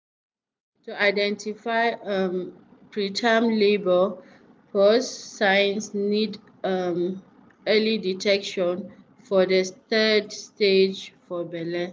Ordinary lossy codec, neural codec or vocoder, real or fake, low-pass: none; none; real; none